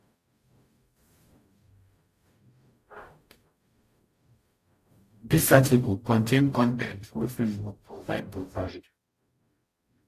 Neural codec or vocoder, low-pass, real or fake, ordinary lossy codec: codec, 44.1 kHz, 0.9 kbps, DAC; 14.4 kHz; fake; AAC, 64 kbps